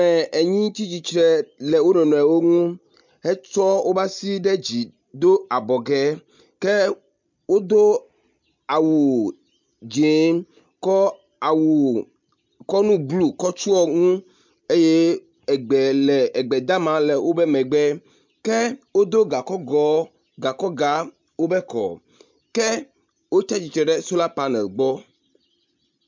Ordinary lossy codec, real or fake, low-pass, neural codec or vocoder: MP3, 64 kbps; real; 7.2 kHz; none